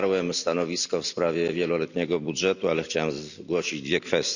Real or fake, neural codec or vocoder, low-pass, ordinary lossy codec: real; none; 7.2 kHz; none